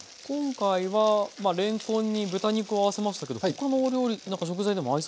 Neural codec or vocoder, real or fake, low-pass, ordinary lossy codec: none; real; none; none